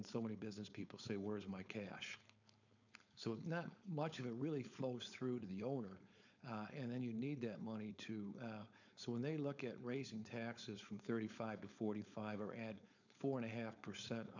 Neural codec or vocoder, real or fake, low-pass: codec, 16 kHz, 4.8 kbps, FACodec; fake; 7.2 kHz